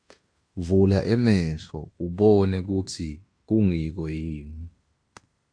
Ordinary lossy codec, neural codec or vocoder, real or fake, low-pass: AAC, 64 kbps; codec, 16 kHz in and 24 kHz out, 0.9 kbps, LongCat-Audio-Codec, fine tuned four codebook decoder; fake; 9.9 kHz